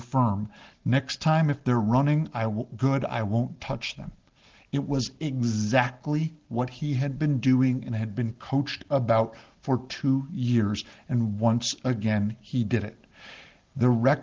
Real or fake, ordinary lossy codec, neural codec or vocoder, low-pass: real; Opus, 16 kbps; none; 7.2 kHz